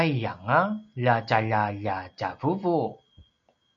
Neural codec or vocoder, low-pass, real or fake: none; 7.2 kHz; real